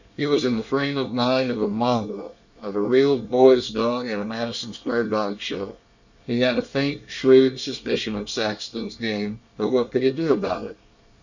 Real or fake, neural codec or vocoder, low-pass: fake; codec, 24 kHz, 1 kbps, SNAC; 7.2 kHz